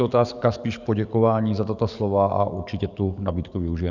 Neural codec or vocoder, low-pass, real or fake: codec, 44.1 kHz, 7.8 kbps, DAC; 7.2 kHz; fake